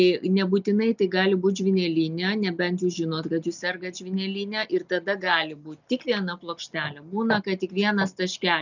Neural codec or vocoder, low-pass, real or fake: none; 7.2 kHz; real